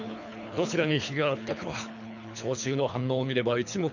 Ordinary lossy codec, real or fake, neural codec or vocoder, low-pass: none; fake; codec, 24 kHz, 3 kbps, HILCodec; 7.2 kHz